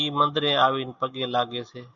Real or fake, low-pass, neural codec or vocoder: real; 7.2 kHz; none